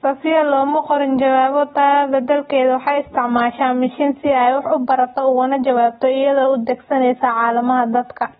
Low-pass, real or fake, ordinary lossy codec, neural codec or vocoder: 10.8 kHz; real; AAC, 16 kbps; none